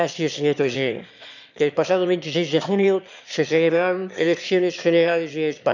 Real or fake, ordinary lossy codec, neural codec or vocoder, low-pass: fake; none; autoencoder, 22.05 kHz, a latent of 192 numbers a frame, VITS, trained on one speaker; 7.2 kHz